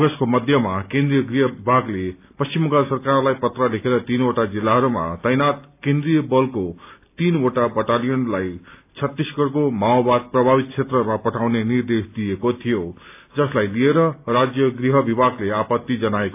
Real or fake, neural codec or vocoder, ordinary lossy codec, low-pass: real; none; AAC, 32 kbps; 3.6 kHz